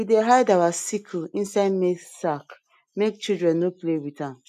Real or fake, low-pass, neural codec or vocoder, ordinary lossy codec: real; 14.4 kHz; none; none